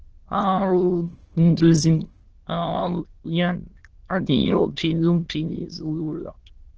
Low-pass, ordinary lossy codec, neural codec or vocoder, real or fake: 7.2 kHz; Opus, 16 kbps; autoencoder, 22.05 kHz, a latent of 192 numbers a frame, VITS, trained on many speakers; fake